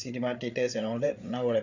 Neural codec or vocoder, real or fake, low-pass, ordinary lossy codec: codec, 16 kHz, 8 kbps, FreqCodec, larger model; fake; 7.2 kHz; none